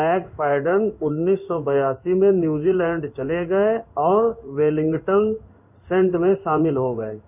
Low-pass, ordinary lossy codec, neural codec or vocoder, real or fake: 3.6 kHz; none; codec, 16 kHz in and 24 kHz out, 1 kbps, XY-Tokenizer; fake